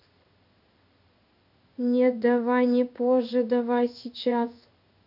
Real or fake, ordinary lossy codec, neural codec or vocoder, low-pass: fake; none; codec, 16 kHz in and 24 kHz out, 1 kbps, XY-Tokenizer; 5.4 kHz